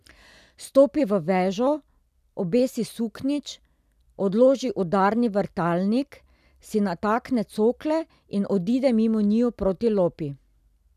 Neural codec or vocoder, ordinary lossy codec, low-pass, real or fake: none; none; 14.4 kHz; real